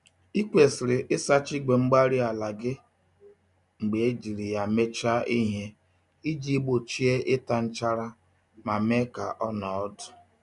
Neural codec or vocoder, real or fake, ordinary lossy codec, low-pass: none; real; none; 10.8 kHz